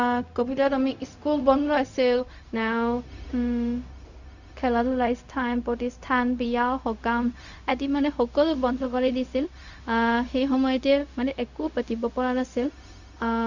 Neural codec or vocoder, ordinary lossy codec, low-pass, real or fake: codec, 16 kHz, 0.4 kbps, LongCat-Audio-Codec; none; 7.2 kHz; fake